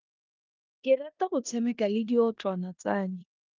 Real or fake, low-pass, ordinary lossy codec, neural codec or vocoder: fake; 7.2 kHz; Opus, 32 kbps; codec, 16 kHz in and 24 kHz out, 0.9 kbps, LongCat-Audio-Codec, four codebook decoder